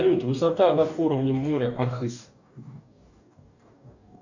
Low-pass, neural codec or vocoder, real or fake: 7.2 kHz; codec, 44.1 kHz, 2.6 kbps, DAC; fake